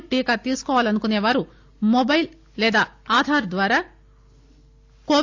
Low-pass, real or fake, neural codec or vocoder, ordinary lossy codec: 7.2 kHz; real; none; AAC, 48 kbps